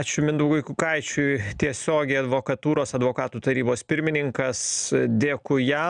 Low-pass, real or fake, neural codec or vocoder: 9.9 kHz; real; none